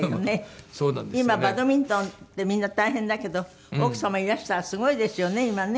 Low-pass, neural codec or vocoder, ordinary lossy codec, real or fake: none; none; none; real